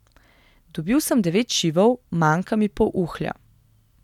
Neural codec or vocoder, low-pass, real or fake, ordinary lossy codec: none; 19.8 kHz; real; none